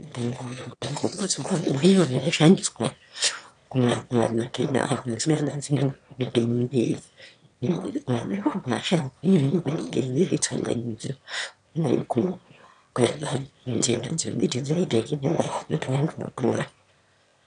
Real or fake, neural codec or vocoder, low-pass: fake; autoencoder, 22.05 kHz, a latent of 192 numbers a frame, VITS, trained on one speaker; 9.9 kHz